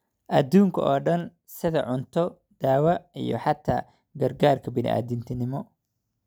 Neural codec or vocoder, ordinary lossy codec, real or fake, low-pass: none; none; real; none